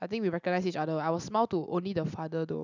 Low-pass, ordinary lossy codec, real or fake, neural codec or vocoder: 7.2 kHz; none; real; none